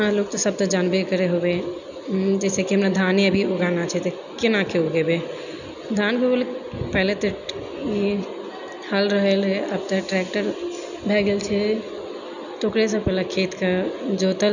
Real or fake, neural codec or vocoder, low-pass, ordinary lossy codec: real; none; 7.2 kHz; none